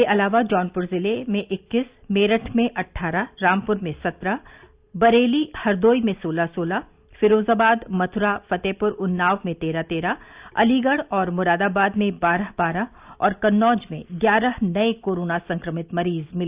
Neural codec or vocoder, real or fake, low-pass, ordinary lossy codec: none; real; 3.6 kHz; Opus, 64 kbps